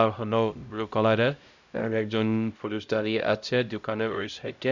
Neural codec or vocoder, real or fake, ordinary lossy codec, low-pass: codec, 16 kHz, 0.5 kbps, X-Codec, HuBERT features, trained on LibriSpeech; fake; none; 7.2 kHz